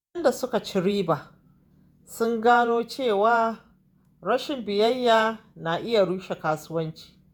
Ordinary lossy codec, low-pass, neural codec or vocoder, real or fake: none; none; vocoder, 48 kHz, 128 mel bands, Vocos; fake